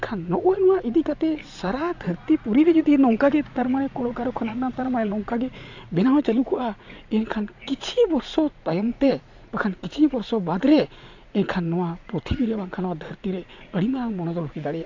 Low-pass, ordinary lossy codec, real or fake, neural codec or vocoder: 7.2 kHz; AAC, 48 kbps; fake; vocoder, 44.1 kHz, 80 mel bands, Vocos